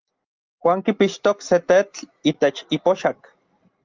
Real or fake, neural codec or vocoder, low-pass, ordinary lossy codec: real; none; 7.2 kHz; Opus, 24 kbps